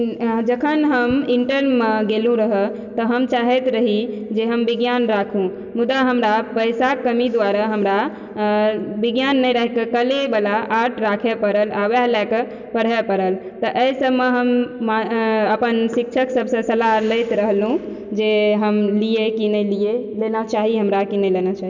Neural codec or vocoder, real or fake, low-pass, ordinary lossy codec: none; real; 7.2 kHz; none